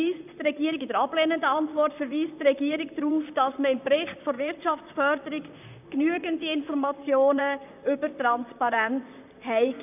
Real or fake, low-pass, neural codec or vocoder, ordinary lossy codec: fake; 3.6 kHz; vocoder, 44.1 kHz, 128 mel bands, Pupu-Vocoder; none